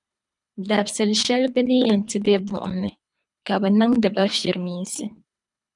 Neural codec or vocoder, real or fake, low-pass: codec, 24 kHz, 3 kbps, HILCodec; fake; 10.8 kHz